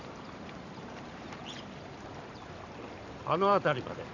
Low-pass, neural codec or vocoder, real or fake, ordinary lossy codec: 7.2 kHz; vocoder, 44.1 kHz, 128 mel bands every 512 samples, BigVGAN v2; fake; none